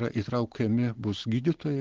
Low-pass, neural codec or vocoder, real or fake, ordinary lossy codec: 7.2 kHz; none; real; Opus, 16 kbps